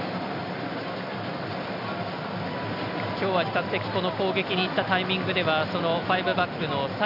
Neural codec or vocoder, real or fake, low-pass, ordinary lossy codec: none; real; 5.4 kHz; none